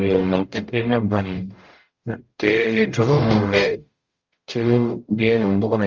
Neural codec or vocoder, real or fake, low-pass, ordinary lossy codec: codec, 44.1 kHz, 0.9 kbps, DAC; fake; 7.2 kHz; Opus, 32 kbps